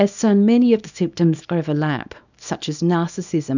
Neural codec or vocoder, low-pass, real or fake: codec, 24 kHz, 0.9 kbps, WavTokenizer, small release; 7.2 kHz; fake